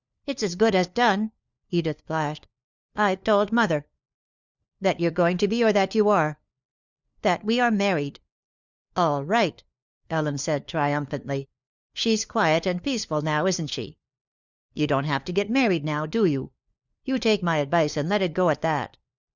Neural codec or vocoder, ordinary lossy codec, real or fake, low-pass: codec, 16 kHz, 4 kbps, FunCodec, trained on LibriTTS, 50 frames a second; Opus, 64 kbps; fake; 7.2 kHz